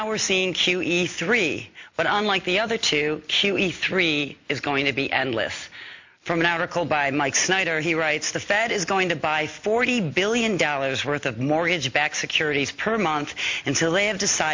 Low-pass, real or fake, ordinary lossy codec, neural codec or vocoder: 7.2 kHz; real; MP3, 64 kbps; none